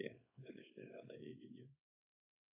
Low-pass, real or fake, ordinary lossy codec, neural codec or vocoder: 3.6 kHz; fake; AAC, 32 kbps; codec, 24 kHz, 0.9 kbps, WavTokenizer, small release